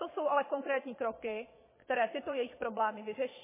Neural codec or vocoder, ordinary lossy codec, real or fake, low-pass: vocoder, 22.05 kHz, 80 mel bands, Vocos; MP3, 16 kbps; fake; 3.6 kHz